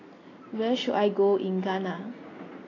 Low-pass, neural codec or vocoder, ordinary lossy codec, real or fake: 7.2 kHz; none; AAC, 32 kbps; real